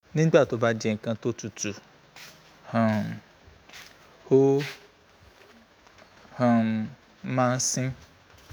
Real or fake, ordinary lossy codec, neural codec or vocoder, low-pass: fake; none; autoencoder, 48 kHz, 128 numbers a frame, DAC-VAE, trained on Japanese speech; none